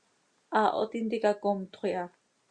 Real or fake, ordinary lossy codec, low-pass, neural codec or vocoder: real; Opus, 64 kbps; 9.9 kHz; none